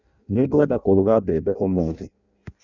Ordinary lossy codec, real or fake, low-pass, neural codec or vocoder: Opus, 64 kbps; fake; 7.2 kHz; codec, 16 kHz in and 24 kHz out, 0.6 kbps, FireRedTTS-2 codec